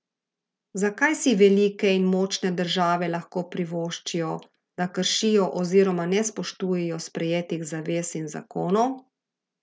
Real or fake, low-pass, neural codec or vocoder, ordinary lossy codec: real; none; none; none